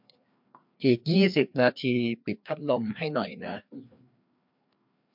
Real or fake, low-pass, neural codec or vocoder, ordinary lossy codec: fake; 5.4 kHz; codec, 16 kHz, 2 kbps, FreqCodec, larger model; MP3, 48 kbps